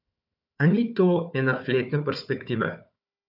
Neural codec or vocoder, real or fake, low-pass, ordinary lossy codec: codec, 16 kHz, 4 kbps, FunCodec, trained on Chinese and English, 50 frames a second; fake; 5.4 kHz; none